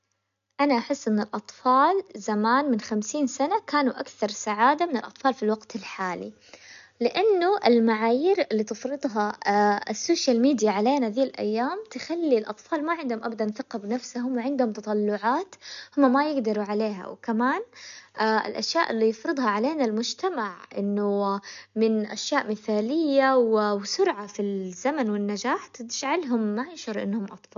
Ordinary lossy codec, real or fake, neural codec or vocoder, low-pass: none; real; none; 7.2 kHz